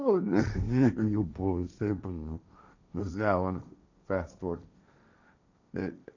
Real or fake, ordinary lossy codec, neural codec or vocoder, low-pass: fake; none; codec, 16 kHz, 1.1 kbps, Voila-Tokenizer; 7.2 kHz